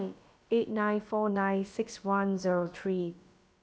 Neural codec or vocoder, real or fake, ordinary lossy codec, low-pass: codec, 16 kHz, about 1 kbps, DyCAST, with the encoder's durations; fake; none; none